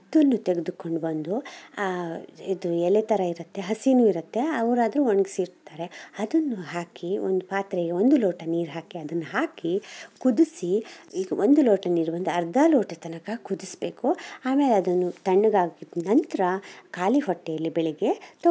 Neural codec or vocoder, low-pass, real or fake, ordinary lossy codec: none; none; real; none